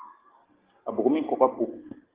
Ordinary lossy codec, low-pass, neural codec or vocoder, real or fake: Opus, 16 kbps; 3.6 kHz; none; real